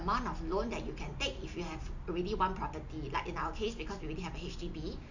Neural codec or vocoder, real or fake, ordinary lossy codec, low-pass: none; real; none; 7.2 kHz